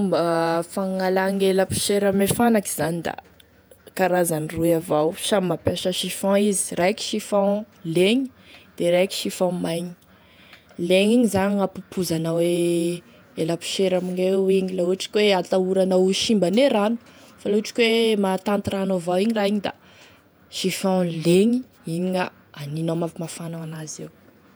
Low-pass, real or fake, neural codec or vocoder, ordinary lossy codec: none; fake; vocoder, 48 kHz, 128 mel bands, Vocos; none